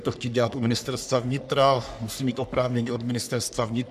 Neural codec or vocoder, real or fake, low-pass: codec, 44.1 kHz, 3.4 kbps, Pupu-Codec; fake; 14.4 kHz